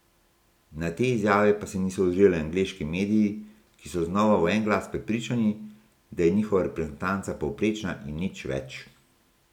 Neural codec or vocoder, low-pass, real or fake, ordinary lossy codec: none; 19.8 kHz; real; none